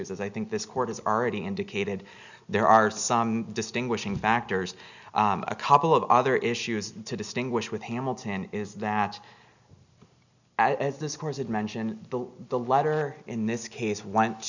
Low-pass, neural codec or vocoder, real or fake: 7.2 kHz; none; real